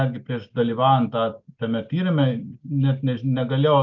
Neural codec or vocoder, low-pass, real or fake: none; 7.2 kHz; real